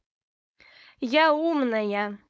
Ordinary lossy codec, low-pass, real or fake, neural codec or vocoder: none; none; fake; codec, 16 kHz, 4.8 kbps, FACodec